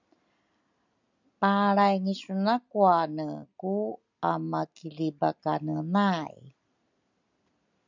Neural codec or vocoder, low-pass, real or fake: none; 7.2 kHz; real